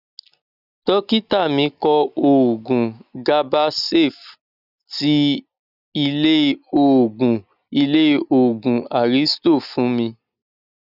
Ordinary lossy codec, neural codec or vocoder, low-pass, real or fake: none; none; 5.4 kHz; real